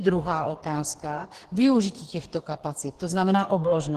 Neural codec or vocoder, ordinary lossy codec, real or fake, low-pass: codec, 44.1 kHz, 2.6 kbps, DAC; Opus, 16 kbps; fake; 14.4 kHz